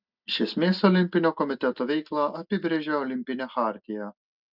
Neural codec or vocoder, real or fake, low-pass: none; real; 5.4 kHz